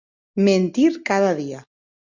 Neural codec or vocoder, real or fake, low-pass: none; real; 7.2 kHz